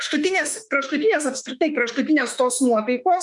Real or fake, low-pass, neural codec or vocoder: fake; 10.8 kHz; autoencoder, 48 kHz, 32 numbers a frame, DAC-VAE, trained on Japanese speech